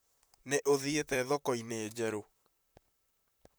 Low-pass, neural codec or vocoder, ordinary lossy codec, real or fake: none; vocoder, 44.1 kHz, 128 mel bands, Pupu-Vocoder; none; fake